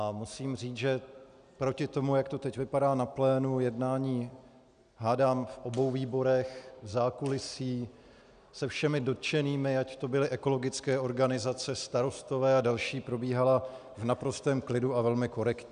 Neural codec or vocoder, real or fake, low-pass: none; real; 9.9 kHz